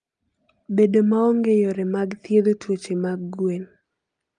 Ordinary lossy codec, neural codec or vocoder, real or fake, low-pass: Opus, 32 kbps; none; real; 9.9 kHz